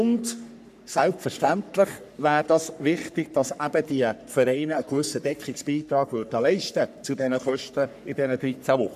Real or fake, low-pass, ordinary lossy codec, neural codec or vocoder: fake; 14.4 kHz; none; codec, 44.1 kHz, 3.4 kbps, Pupu-Codec